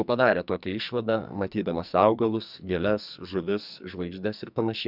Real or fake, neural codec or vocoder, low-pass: fake; codec, 44.1 kHz, 2.6 kbps, SNAC; 5.4 kHz